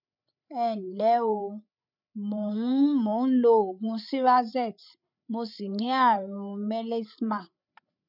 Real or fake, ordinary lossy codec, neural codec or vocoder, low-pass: fake; none; codec, 16 kHz, 8 kbps, FreqCodec, larger model; 5.4 kHz